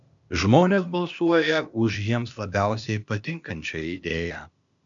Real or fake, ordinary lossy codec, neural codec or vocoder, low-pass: fake; MP3, 64 kbps; codec, 16 kHz, 0.8 kbps, ZipCodec; 7.2 kHz